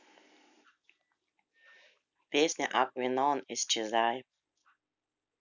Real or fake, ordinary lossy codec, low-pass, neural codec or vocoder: real; none; 7.2 kHz; none